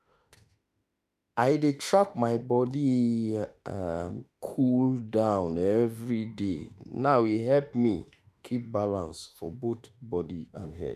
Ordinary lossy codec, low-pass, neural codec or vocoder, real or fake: none; 14.4 kHz; autoencoder, 48 kHz, 32 numbers a frame, DAC-VAE, trained on Japanese speech; fake